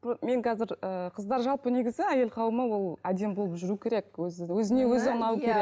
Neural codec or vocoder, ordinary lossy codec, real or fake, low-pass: none; none; real; none